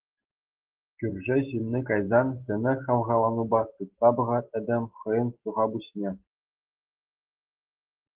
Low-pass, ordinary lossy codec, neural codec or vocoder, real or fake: 3.6 kHz; Opus, 16 kbps; none; real